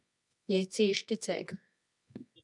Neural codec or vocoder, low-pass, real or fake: codec, 24 kHz, 0.9 kbps, WavTokenizer, medium music audio release; 10.8 kHz; fake